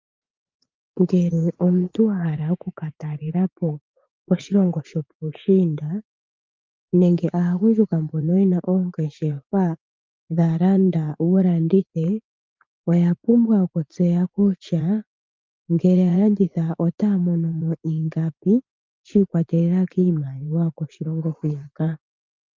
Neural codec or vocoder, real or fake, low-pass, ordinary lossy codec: vocoder, 44.1 kHz, 80 mel bands, Vocos; fake; 7.2 kHz; Opus, 24 kbps